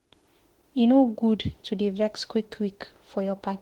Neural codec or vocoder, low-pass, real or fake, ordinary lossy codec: autoencoder, 48 kHz, 32 numbers a frame, DAC-VAE, trained on Japanese speech; 19.8 kHz; fake; Opus, 16 kbps